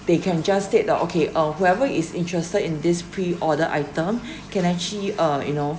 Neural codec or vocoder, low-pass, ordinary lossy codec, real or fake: none; none; none; real